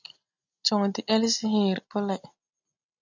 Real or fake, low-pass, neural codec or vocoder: real; 7.2 kHz; none